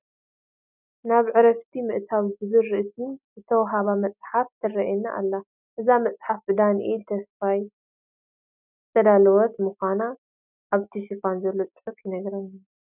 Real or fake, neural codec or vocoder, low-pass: real; none; 3.6 kHz